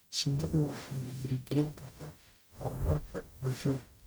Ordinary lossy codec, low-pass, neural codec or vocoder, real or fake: none; none; codec, 44.1 kHz, 0.9 kbps, DAC; fake